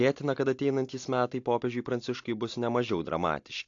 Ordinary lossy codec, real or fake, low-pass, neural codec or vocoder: AAC, 48 kbps; real; 7.2 kHz; none